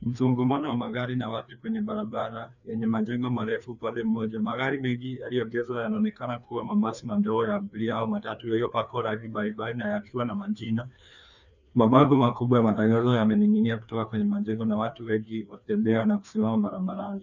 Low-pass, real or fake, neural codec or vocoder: 7.2 kHz; fake; codec, 16 kHz, 2 kbps, FreqCodec, larger model